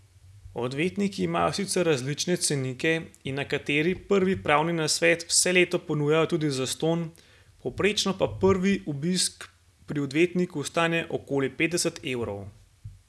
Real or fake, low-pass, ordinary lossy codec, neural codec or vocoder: real; none; none; none